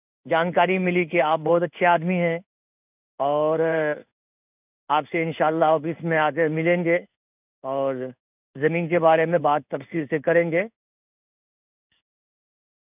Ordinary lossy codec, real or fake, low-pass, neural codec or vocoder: none; fake; 3.6 kHz; codec, 16 kHz in and 24 kHz out, 1 kbps, XY-Tokenizer